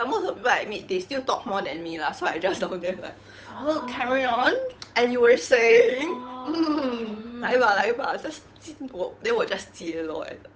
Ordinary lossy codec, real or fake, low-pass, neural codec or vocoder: none; fake; none; codec, 16 kHz, 8 kbps, FunCodec, trained on Chinese and English, 25 frames a second